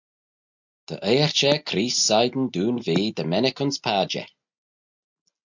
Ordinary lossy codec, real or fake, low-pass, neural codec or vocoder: MP3, 64 kbps; real; 7.2 kHz; none